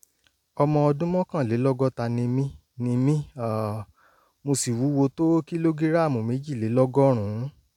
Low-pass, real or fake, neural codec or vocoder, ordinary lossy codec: 19.8 kHz; real; none; none